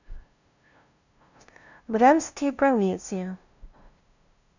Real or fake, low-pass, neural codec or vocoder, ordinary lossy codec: fake; 7.2 kHz; codec, 16 kHz, 0.5 kbps, FunCodec, trained on LibriTTS, 25 frames a second; none